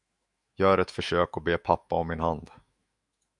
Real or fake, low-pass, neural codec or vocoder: fake; 10.8 kHz; autoencoder, 48 kHz, 128 numbers a frame, DAC-VAE, trained on Japanese speech